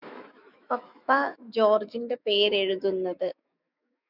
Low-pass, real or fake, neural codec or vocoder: 5.4 kHz; fake; autoencoder, 48 kHz, 128 numbers a frame, DAC-VAE, trained on Japanese speech